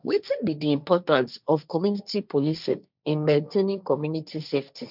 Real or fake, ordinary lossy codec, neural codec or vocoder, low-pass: fake; none; codec, 16 kHz, 1.1 kbps, Voila-Tokenizer; 5.4 kHz